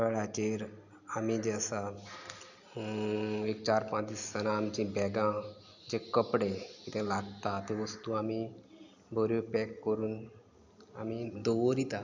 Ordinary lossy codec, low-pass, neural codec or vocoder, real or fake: none; 7.2 kHz; none; real